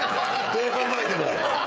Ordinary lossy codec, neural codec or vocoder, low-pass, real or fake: none; codec, 16 kHz, 16 kbps, FreqCodec, smaller model; none; fake